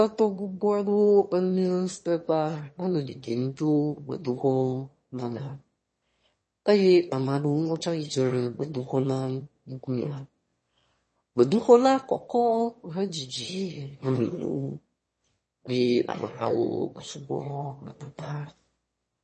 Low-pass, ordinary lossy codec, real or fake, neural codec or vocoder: 9.9 kHz; MP3, 32 kbps; fake; autoencoder, 22.05 kHz, a latent of 192 numbers a frame, VITS, trained on one speaker